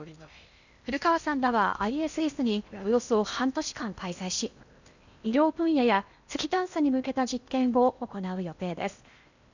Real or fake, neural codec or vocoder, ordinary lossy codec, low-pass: fake; codec, 16 kHz in and 24 kHz out, 0.8 kbps, FocalCodec, streaming, 65536 codes; none; 7.2 kHz